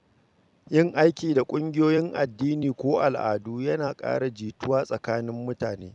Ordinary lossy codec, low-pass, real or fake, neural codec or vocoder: none; 10.8 kHz; fake; vocoder, 44.1 kHz, 128 mel bands every 256 samples, BigVGAN v2